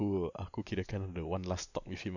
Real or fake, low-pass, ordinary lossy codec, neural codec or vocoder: real; 7.2 kHz; none; none